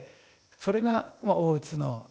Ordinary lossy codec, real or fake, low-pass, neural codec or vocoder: none; fake; none; codec, 16 kHz, 0.8 kbps, ZipCodec